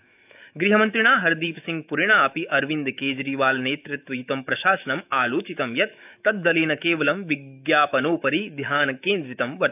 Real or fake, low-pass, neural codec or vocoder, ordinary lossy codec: fake; 3.6 kHz; autoencoder, 48 kHz, 128 numbers a frame, DAC-VAE, trained on Japanese speech; none